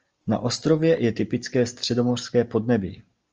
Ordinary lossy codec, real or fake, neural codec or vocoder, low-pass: Opus, 24 kbps; real; none; 7.2 kHz